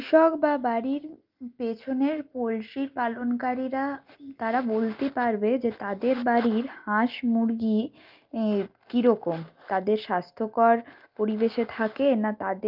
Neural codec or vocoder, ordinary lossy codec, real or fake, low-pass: none; Opus, 32 kbps; real; 5.4 kHz